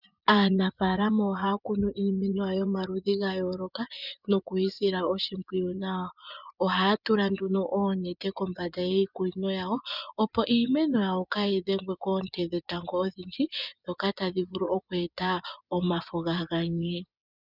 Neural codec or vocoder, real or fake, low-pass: vocoder, 24 kHz, 100 mel bands, Vocos; fake; 5.4 kHz